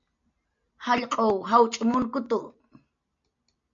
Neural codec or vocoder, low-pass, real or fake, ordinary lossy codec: none; 7.2 kHz; real; MP3, 48 kbps